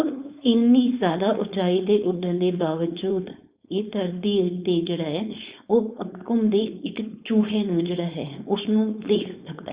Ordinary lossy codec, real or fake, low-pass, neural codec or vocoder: Opus, 64 kbps; fake; 3.6 kHz; codec, 16 kHz, 4.8 kbps, FACodec